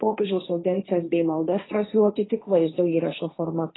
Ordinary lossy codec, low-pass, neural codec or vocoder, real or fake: AAC, 16 kbps; 7.2 kHz; codec, 16 kHz, 1.1 kbps, Voila-Tokenizer; fake